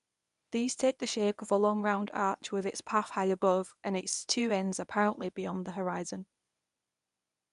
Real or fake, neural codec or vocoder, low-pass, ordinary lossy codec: fake; codec, 24 kHz, 0.9 kbps, WavTokenizer, medium speech release version 1; 10.8 kHz; none